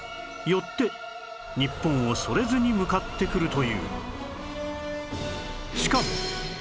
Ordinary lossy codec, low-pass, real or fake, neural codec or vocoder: none; none; real; none